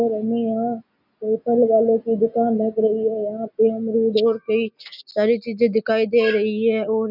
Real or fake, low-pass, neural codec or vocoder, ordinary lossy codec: real; 5.4 kHz; none; none